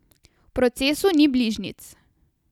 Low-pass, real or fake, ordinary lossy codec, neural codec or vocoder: 19.8 kHz; real; none; none